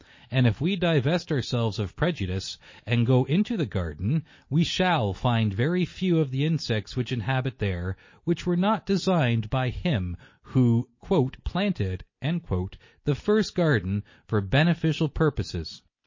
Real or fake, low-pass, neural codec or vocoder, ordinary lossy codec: real; 7.2 kHz; none; MP3, 32 kbps